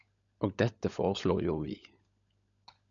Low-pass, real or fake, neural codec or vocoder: 7.2 kHz; fake; codec, 16 kHz, 16 kbps, FunCodec, trained on LibriTTS, 50 frames a second